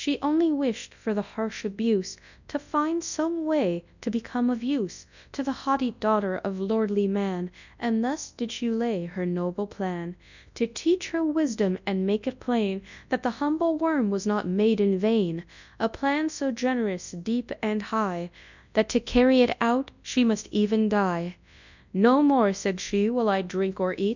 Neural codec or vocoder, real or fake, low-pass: codec, 24 kHz, 0.9 kbps, WavTokenizer, large speech release; fake; 7.2 kHz